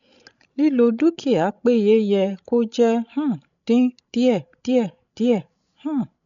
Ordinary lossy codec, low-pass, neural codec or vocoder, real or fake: none; 7.2 kHz; codec, 16 kHz, 16 kbps, FreqCodec, larger model; fake